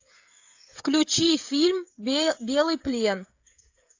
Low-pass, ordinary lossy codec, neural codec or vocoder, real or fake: 7.2 kHz; AAC, 48 kbps; codec, 16 kHz, 16 kbps, FreqCodec, smaller model; fake